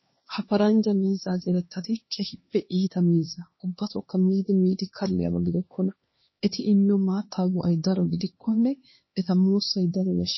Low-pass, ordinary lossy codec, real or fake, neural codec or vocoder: 7.2 kHz; MP3, 24 kbps; fake; codec, 16 kHz, 2 kbps, X-Codec, HuBERT features, trained on LibriSpeech